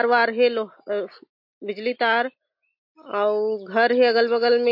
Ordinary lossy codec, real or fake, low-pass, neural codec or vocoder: MP3, 32 kbps; real; 5.4 kHz; none